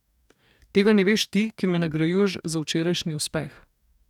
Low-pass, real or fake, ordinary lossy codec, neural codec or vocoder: 19.8 kHz; fake; none; codec, 44.1 kHz, 2.6 kbps, DAC